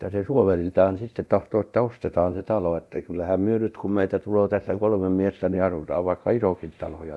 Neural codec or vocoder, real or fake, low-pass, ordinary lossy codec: codec, 24 kHz, 0.9 kbps, DualCodec; fake; none; none